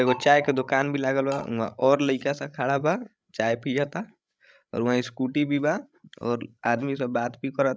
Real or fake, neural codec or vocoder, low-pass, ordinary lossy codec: fake; codec, 16 kHz, 16 kbps, FreqCodec, larger model; none; none